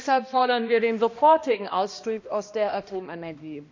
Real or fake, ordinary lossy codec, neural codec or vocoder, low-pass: fake; MP3, 48 kbps; codec, 16 kHz, 1 kbps, X-Codec, HuBERT features, trained on balanced general audio; 7.2 kHz